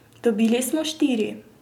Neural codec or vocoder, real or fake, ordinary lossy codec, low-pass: none; real; none; 19.8 kHz